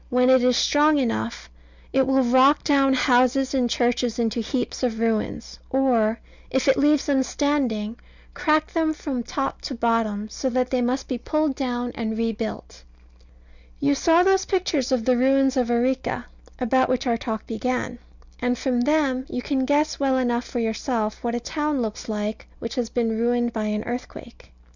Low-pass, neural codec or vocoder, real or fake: 7.2 kHz; none; real